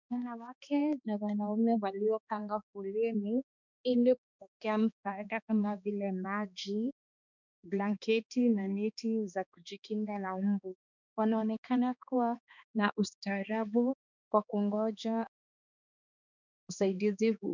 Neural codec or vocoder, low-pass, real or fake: codec, 16 kHz, 2 kbps, X-Codec, HuBERT features, trained on balanced general audio; 7.2 kHz; fake